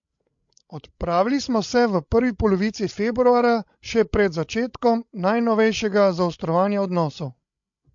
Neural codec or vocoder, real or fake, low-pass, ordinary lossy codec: codec, 16 kHz, 16 kbps, FreqCodec, larger model; fake; 7.2 kHz; MP3, 48 kbps